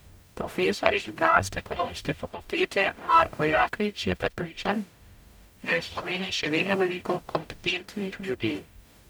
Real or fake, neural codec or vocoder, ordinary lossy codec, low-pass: fake; codec, 44.1 kHz, 0.9 kbps, DAC; none; none